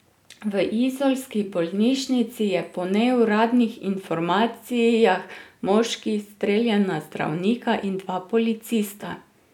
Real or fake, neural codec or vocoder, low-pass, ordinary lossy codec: real; none; 19.8 kHz; none